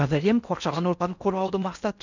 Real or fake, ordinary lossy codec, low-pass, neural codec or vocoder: fake; none; 7.2 kHz; codec, 16 kHz in and 24 kHz out, 0.6 kbps, FocalCodec, streaming, 4096 codes